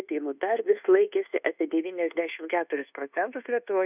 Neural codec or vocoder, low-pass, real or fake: codec, 24 kHz, 1.2 kbps, DualCodec; 3.6 kHz; fake